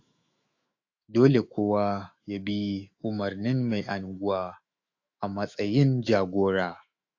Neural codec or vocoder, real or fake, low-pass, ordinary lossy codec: autoencoder, 48 kHz, 128 numbers a frame, DAC-VAE, trained on Japanese speech; fake; 7.2 kHz; AAC, 48 kbps